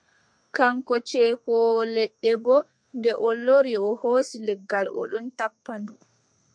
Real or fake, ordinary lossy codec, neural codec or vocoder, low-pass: fake; MP3, 64 kbps; codec, 32 kHz, 1.9 kbps, SNAC; 9.9 kHz